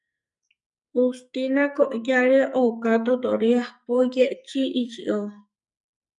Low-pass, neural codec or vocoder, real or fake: 10.8 kHz; codec, 44.1 kHz, 2.6 kbps, SNAC; fake